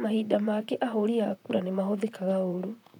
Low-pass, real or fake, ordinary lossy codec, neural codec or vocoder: 19.8 kHz; fake; none; vocoder, 44.1 kHz, 128 mel bands, Pupu-Vocoder